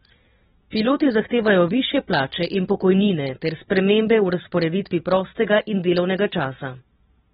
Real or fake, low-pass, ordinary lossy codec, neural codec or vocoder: real; 19.8 kHz; AAC, 16 kbps; none